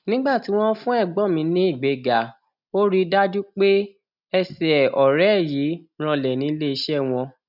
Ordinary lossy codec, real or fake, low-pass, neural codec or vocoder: none; real; 5.4 kHz; none